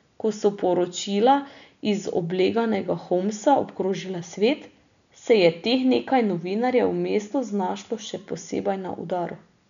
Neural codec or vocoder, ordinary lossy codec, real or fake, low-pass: none; none; real; 7.2 kHz